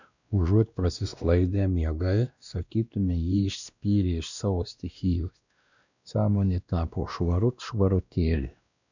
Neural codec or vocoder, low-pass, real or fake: codec, 16 kHz, 1 kbps, X-Codec, WavLM features, trained on Multilingual LibriSpeech; 7.2 kHz; fake